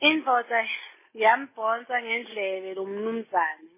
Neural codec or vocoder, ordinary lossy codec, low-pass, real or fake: none; MP3, 16 kbps; 3.6 kHz; real